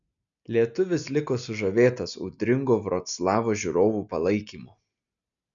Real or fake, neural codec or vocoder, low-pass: real; none; 7.2 kHz